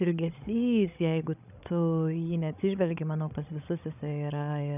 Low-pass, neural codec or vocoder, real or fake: 3.6 kHz; codec, 16 kHz, 16 kbps, FreqCodec, larger model; fake